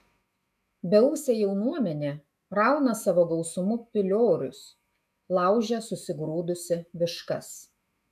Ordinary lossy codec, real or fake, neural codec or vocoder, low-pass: MP3, 96 kbps; fake; autoencoder, 48 kHz, 128 numbers a frame, DAC-VAE, trained on Japanese speech; 14.4 kHz